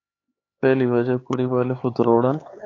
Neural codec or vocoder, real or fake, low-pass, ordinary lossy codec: codec, 16 kHz, 4 kbps, X-Codec, HuBERT features, trained on LibriSpeech; fake; 7.2 kHz; AAC, 32 kbps